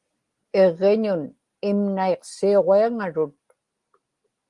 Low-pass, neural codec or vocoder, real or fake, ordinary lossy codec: 10.8 kHz; none; real; Opus, 24 kbps